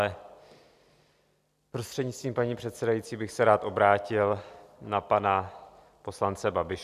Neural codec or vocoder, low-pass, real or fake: none; 14.4 kHz; real